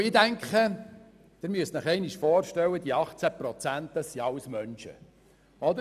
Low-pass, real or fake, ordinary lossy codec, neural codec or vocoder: 14.4 kHz; real; none; none